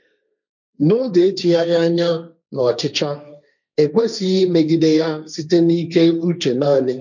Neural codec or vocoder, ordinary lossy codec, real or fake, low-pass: codec, 16 kHz, 1.1 kbps, Voila-Tokenizer; none; fake; 7.2 kHz